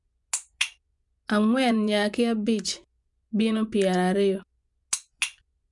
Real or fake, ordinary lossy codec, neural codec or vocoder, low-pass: fake; none; vocoder, 24 kHz, 100 mel bands, Vocos; 10.8 kHz